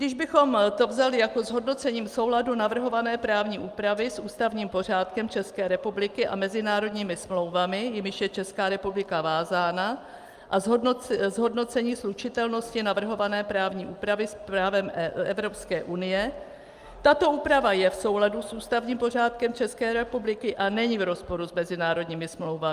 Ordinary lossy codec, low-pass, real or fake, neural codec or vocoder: Opus, 32 kbps; 14.4 kHz; real; none